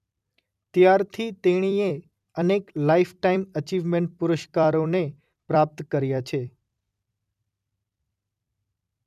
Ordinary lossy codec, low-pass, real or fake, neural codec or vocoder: none; 14.4 kHz; fake; vocoder, 44.1 kHz, 128 mel bands every 256 samples, BigVGAN v2